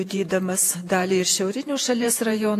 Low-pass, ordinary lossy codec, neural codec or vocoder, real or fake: 14.4 kHz; AAC, 64 kbps; vocoder, 48 kHz, 128 mel bands, Vocos; fake